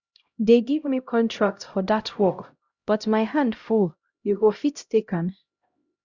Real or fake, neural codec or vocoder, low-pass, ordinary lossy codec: fake; codec, 16 kHz, 0.5 kbps, X-Codec, HuBERT features, trained on LibriSpeech; 7.2 kHz; Opus, 64 kbps